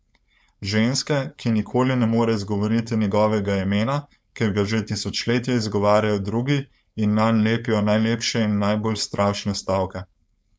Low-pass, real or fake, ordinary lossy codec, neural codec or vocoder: none; fake; none; codec, 16 kHz, 4.8 kbps, FACodec